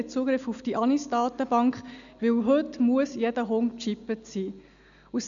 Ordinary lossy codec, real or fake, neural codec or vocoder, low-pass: none; real; none; 7.2 kHz